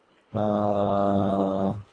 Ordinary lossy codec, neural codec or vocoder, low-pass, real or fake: AAC, 32 kbps; codec, 24 kHz, 1.5 kbps, HILCodec; 9.9 kHz; fake